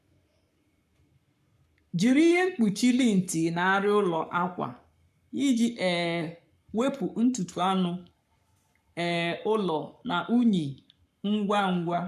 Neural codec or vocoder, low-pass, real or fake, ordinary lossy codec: codec, 44.1 kHz, 7.8 kbps, Pupu-Codec; 14.4 kHz; fake; none